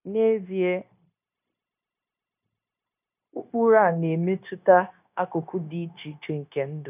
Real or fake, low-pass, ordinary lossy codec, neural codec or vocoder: fake; 3.6 kHz; none; codec, 16 kHz, 0.9 kbps, LongCat-Audio-Codec